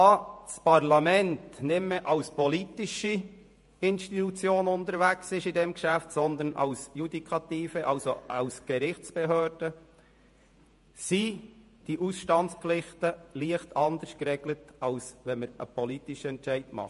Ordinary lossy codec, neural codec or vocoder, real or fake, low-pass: MP3, 48 kbps; vocoder, 48 kHz, 128 mel bands, Vocos; fake; 14.4 kHz